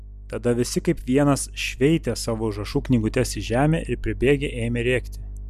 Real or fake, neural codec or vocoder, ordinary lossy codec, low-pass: real; none; MP3, 96 kbps; 14.4 kHz